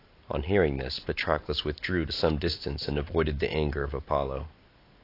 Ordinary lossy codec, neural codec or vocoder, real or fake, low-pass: AAC, 32 kbps; none; real; 5.4 kHz